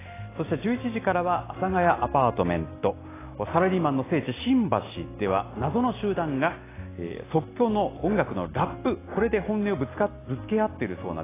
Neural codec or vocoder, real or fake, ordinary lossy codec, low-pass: none; real; AAC, 16 kbps; 3.6 kHz